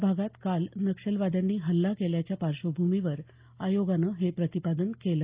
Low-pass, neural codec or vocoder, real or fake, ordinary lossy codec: 3.6 kHz; none; real; Opus, 32 kbps